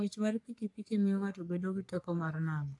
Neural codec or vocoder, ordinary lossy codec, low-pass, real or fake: codec, 44.1 kHz, 3.4 kbps, Pupu-Codec; AAC, 64 kbps; 10.8 kHz; fake